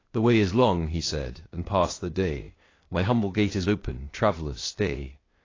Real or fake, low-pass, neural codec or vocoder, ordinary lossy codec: fake; 7.2 kHz; codec, 16 kHz, 0.8 kbps, ZipCodec; AAC, 32 kbps